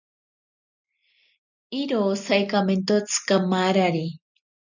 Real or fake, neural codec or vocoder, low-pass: real; none; 7.2 kHz